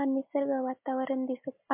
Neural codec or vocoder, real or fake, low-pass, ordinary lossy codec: none; real; 3.6 kHz; MP3, 32 kbps